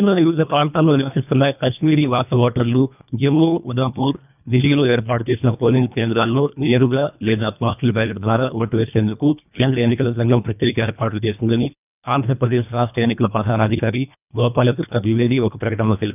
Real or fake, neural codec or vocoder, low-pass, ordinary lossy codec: fake; codec, 24 kHz, 1.5 kbps, HILCodec; 3.6 kHz; none